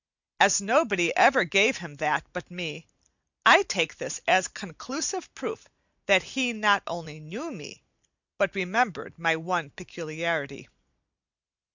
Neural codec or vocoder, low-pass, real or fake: none; 7.2 kHz; real